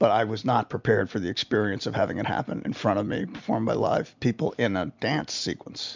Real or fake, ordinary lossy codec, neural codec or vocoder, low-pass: real; MP3, 64 kbps; none; 7.2 kHz